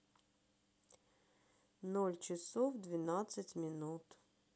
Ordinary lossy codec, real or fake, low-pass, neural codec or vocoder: none; real; none; none